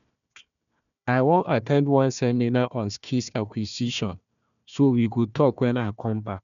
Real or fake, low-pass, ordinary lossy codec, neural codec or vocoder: fake; 7.2 kHz; none; codec, 16 kHz, 1 kbps, FunCodec, trained on Chinese and English, 50 frames a second